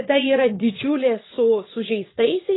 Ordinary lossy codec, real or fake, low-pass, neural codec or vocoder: AAC, 16 kbps; fake; 7.2 kHz; vocoder, 22.05 kHz, 80 mel bands, Vocos